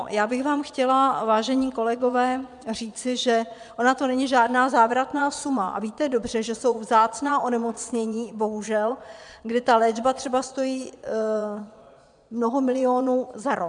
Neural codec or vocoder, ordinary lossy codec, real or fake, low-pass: vocoder, 22.05 kHz, 80 mel bands, Vocos; MP3, 96 kbps; fake; 9.9 kHz